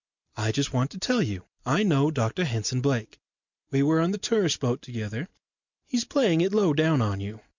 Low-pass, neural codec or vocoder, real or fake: 7.2 kHz; none; real